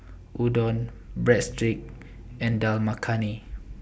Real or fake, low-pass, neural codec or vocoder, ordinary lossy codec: real; none; none; none